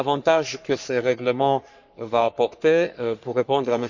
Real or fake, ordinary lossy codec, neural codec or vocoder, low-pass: fake; none; codec, 44.1 kHz, 3.4 kbps, Pupu-Codec; 7.2 kHz